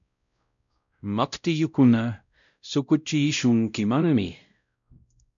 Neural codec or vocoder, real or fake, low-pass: codec, 16 kHz, 0.5 kbps, X-Codec, WavLM features, trained on Multilingual LibriSpeech; fake; 7.2 kHz